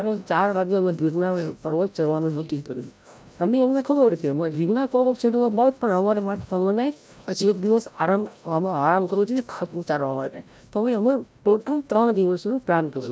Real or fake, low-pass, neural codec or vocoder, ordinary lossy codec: fake; none; codec, 16 kHz, 0.5 kbps, FreqCodec, larger model; none